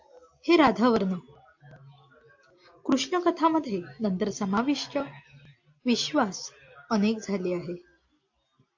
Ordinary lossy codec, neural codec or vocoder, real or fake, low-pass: AAC, 48 kbps; none; real; 7.2 kHz